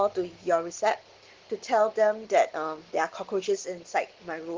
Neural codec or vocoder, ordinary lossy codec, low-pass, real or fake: none; Opus, 16 kbps; 7.2 kHz; real